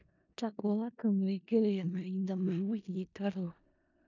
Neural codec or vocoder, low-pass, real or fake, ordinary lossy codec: codec, 16 kHz in and 24 kHz out, 0.4 kbps, LongCat-Audio-Codec, four codebook decoder; 7.2 kHz; fake; none